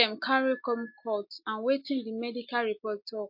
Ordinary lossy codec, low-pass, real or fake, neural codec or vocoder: MP3, 32 kbps; 5.4 kHz; real; none